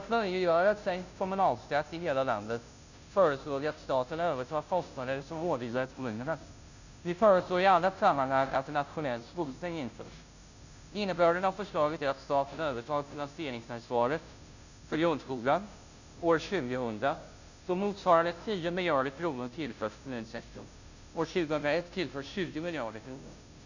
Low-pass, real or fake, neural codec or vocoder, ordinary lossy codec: 7.2 kHz; fake; codec, 16 kHz, 0.5 kbps, FunCodec, trained on Chinese and English, 25 frames a second; none